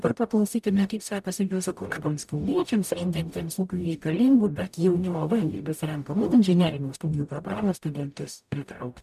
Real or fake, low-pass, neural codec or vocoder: fake; 14.4 kHz; codec, 44.1 kHz, 0.9 kbps, DAC